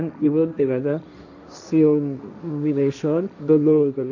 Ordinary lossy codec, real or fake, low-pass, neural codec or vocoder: none; fake; 7.2 kHz; codec, 16 kHz, 1.1 kbps, Voila-Tokenizer